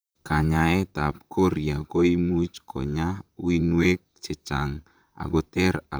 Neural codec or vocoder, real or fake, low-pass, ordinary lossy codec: vocoder, 44.1 kHz, 128 mel bands, Pupu-Vocoder; fake; none; none